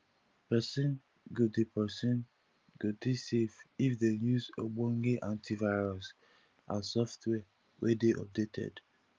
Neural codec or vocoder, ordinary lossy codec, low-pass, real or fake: none; Opus, 32 kbps; 7.2 kHz; real